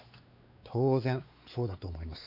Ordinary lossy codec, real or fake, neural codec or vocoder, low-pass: none; fake; codec, 16 kHz, 4 kbps, X-Codec, WavLM features, trained on Multilingual LibriSpeech; 5.4 kHz